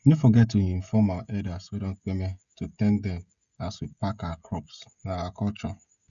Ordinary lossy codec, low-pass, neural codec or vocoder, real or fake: none; 7.2 kHz; codec, 16 kHz, 16 kbps, FreqCodec, smaller model; fake